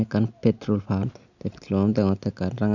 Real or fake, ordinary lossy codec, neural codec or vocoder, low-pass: real; none; none; 7.2 kHz